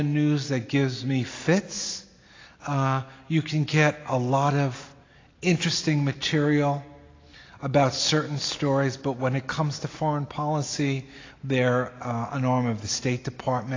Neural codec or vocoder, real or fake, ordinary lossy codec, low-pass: none; real; AAC, 32 kbps; 7.2 kHz